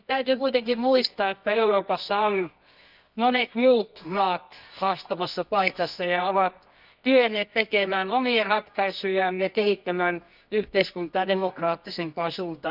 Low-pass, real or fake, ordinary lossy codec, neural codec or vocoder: 5.4 kHz; fake; none; codec, 24 kHz, 0.9 kbps, WavTokenizer, medium music audio release